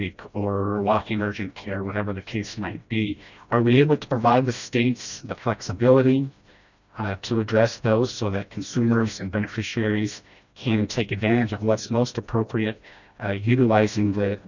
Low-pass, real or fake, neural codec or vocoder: 7.2 kHz; fake; codec, 16 kHz, 1 kbps, FreqCodec, smaller model